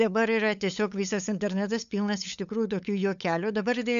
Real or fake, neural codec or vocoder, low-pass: fake; codec, 16 kHz, 8 kbps, FunCodec, trained on LibriTTS, 25 frames a second; 7.2 kHz